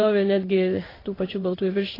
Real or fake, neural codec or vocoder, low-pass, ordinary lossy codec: fake; codec, 16 kHz in and 24 kHz out, 1 kbps, XY-Tokenizer; 5.4 kHz; AAC, 24 kbps